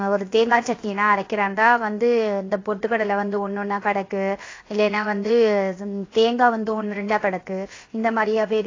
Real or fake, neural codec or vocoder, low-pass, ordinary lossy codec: fake; codec, 16 kHz, 0.7 kbps, FocalCodec; 7.2 kHz; AAC, 32 kbps